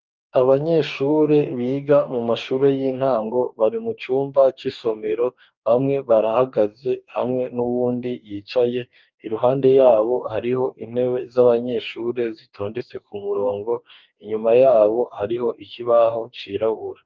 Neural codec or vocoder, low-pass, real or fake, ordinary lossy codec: codec, 44.1 kHz, 2.6 kbps, DAC; 7.2 kHz; fake; Opus, 24 kbps